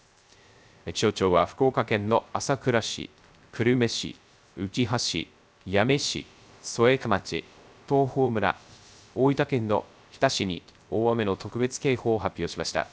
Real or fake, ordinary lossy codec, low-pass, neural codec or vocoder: fake; none; none; codec, 16 kHz, 0.3 kbps, FocalCodec